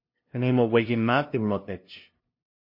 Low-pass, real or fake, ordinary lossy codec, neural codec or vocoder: 5.4 kHz; fake; MP3, 32 kbps; codec, 16 kHz, 0.5 kbps, FunCodec, trained on LibriTTS, 25 frames a second